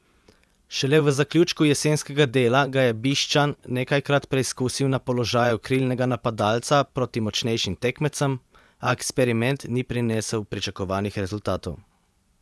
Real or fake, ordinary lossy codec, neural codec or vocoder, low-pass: fake; none; vocoder, 24 kHz, 100 mel bands, Vocos; none